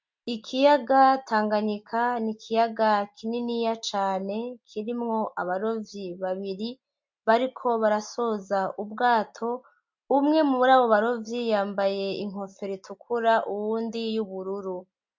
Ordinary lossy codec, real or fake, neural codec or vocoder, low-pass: MP3, 48 kbps; real; none; 7.2 kHz